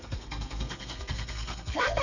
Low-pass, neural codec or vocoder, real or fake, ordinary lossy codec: 7.2 kHz; codec, 32 kHz, 1.9 kbps, SNAC; fake; none